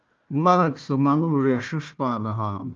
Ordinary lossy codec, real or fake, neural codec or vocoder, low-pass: Opus, 32 kbps; fake; codec, 16 kHz, 1 kbps, FunCodec, trained on Chinese and English, 50 frames a second; 7.2 kHz